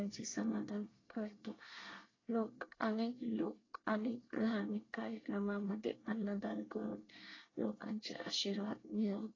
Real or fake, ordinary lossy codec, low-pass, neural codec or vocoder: fake; AAC, 32 kbps; 7.2 kHz; codec, 24 kHz, 1 kbps, SNAC